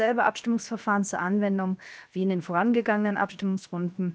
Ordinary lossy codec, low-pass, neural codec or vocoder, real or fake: none; none; codec, 16 kHz, 0.7 kbps, FocalCodec; fake